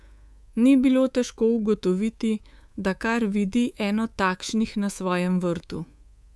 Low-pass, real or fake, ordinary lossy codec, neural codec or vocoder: none; fake; none; codec, 24 kHz, 3.1 kbps, DualCodec